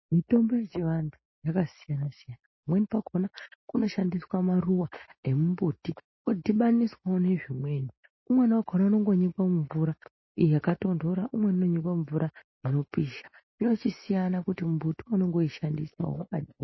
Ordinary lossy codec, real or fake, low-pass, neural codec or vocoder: MP3, 24 kbps; real; 7.2 kHz; none